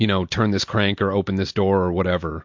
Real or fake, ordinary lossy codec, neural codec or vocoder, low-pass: real; MP3, 48 kbps; none; 7.2 kHz